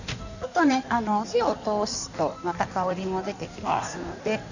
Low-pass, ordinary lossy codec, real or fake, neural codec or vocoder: 7.2 kHz; none; fake; codec, 16 kHz in and 24 kHz out, 1.1 kbps, FireRedTTS-2 codec